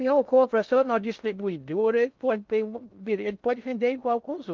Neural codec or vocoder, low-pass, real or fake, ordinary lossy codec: codec, 16 kHz in and 24 kHz out, 0.6 kbps, FocalCodec, streaming, 2048 codes; 7.2 kHz; fake; Opus, 24 kbps